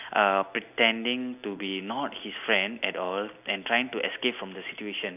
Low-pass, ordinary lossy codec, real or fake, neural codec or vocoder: 3.6 kHz; none; real; none